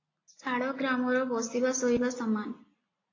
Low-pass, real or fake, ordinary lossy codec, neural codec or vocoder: 7.2 kHz; real; AAC, 32 kbps; none